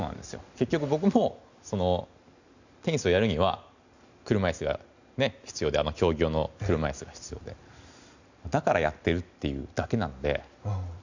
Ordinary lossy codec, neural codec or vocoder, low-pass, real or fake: none; none; 7.2 kHz; real